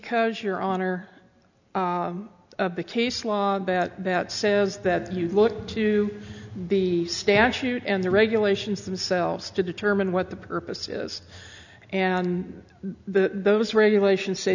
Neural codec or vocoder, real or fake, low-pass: none; real; 7.2 kHz